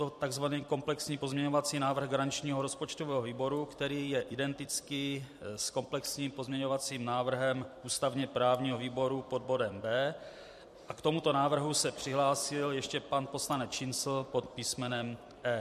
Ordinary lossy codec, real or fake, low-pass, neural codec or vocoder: MP3, 64 kbps; fake; 14.4 kHz; vocoder, 44.1 kHz, 128 mel bands every 256 samples, BigVGAN v2